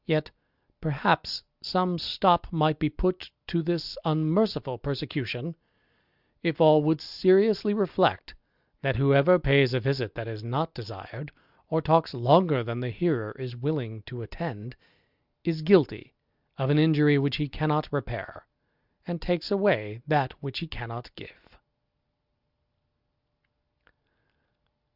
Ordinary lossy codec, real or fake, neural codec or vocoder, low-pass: Opus, 64 kbps; real; none; 5.4 kHz